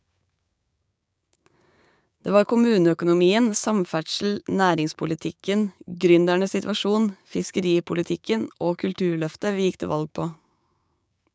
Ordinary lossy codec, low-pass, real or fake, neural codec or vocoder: none; none; fake; codec, 16 kHz, 6 kbps, DAC